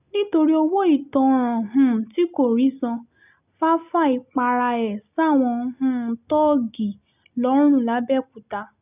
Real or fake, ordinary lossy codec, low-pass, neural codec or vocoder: real; none; 3.6 kHz; none